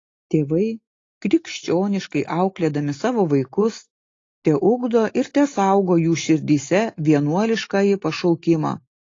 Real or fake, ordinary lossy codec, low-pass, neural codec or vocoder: real; AAC, 32 kbps; 7.2 kHz; none